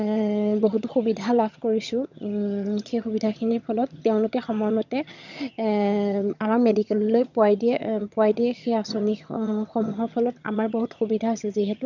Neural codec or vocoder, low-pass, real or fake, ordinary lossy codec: vocoder, 22.05 kHz, 80 mel bands, HiFi-GAN; 7.2 kHz; fake; none